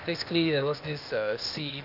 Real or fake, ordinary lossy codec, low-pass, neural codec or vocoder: fake; none; 5.4 kHz; codec, 16 kHz, 0.8 kbps, ZipCodec